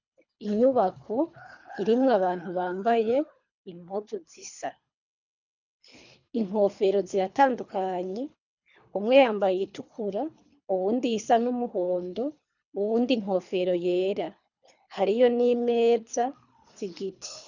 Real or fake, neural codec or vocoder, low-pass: fake; codec, 24 kHz, 3 kbps, HILCodec; 7.2 kHz